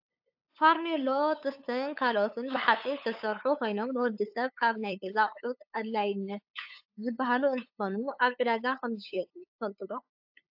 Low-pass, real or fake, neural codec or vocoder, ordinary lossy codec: 5.4 kHz; fake; codec, 16 kHz, 8 kbps, FunCodec, trained on LibriTTS, 25 frames a second; AAC, 48 kbps